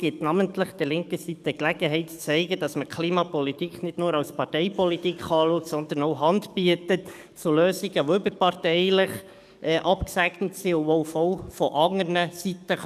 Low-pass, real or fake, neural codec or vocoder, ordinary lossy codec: 14.4 kHz; fake; codec, 44.1 kHz, 7.8 kbps, DAC; none